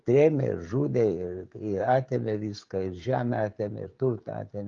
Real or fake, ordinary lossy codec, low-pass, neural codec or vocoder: fake; Opus, 32 kbps; 7.2 kHz; codec, 16 kHz, 16 kbps, FreqCodec, smaller model